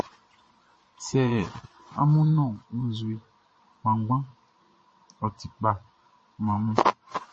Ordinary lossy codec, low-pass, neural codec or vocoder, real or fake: MP3, 32 kbps; 9.9 kHz; vocoder, 22.05 kHz, 80 mel bands, Vocos; fake